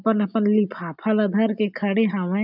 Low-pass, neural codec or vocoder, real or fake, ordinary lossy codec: 5.4 kHz; none; real; none